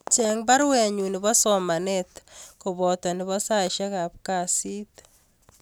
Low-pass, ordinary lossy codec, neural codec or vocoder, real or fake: none; none; none; real